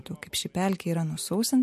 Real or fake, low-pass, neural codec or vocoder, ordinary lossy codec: real; 14.4 kHz; none; MP3, 64 kbps